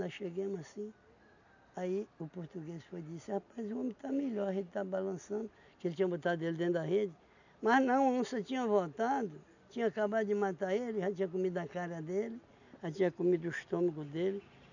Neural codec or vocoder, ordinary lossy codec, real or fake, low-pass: none; none; real; 7.2 kHz